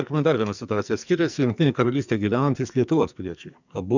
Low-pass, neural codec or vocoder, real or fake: 7.2 kHz; codec, 44.1 kHz, 2.6 kbps, SNAC; fake